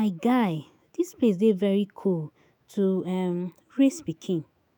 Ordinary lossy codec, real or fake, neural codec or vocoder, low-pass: none; fake; autoencoder, 48 kHz, 128 numbers a frame, DAC-VAE, trained on Japanese speech; none